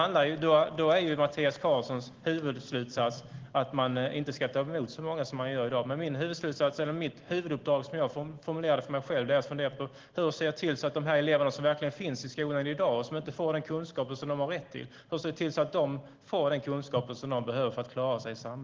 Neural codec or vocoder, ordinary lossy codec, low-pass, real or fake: none; Opus, 16 kbps; 7.2 kHz; real